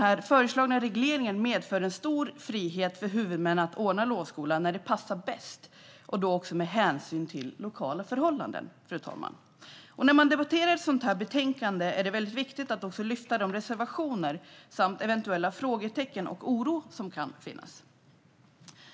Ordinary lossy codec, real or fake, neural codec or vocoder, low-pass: none; real; none; none